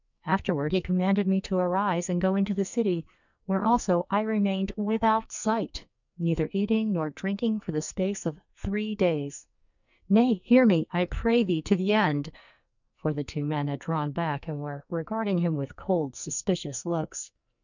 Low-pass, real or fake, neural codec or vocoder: 7.2 kHz; fake; codec, 44.1 kHz, 2.6 kbps, SNAC